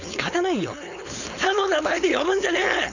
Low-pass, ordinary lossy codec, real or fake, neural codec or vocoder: 7.2 kHz; none; fake; codec, 16 kHz, 4.8 kbps, FACodec